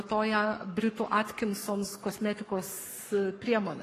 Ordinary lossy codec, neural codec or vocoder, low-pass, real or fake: AAC, 48 kbps; codec, 44.1 kHz, 7.8 kbps, Pupu-Codec; 14.4 kHz; fake